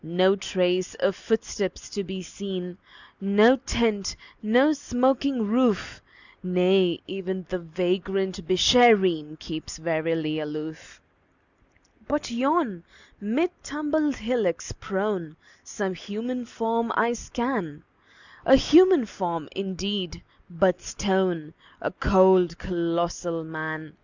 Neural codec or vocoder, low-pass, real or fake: none; 7.2 kHz; real